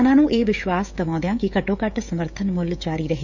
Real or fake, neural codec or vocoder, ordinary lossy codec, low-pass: fake; codec, 16 kHz, 16 kbps, FreqCodec, smaller model; none; 7.2 kHz